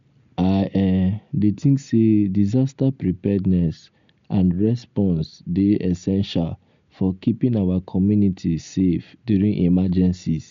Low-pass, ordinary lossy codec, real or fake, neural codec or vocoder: 7.2 kHz; MP3, 64 kbps; real; none